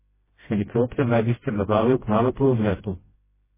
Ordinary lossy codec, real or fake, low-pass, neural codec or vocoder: MP3, 16 kbps; fake; 3.6 kHz; codec, 16 kHz, 0.5 kbps, FreqCodec, smaller model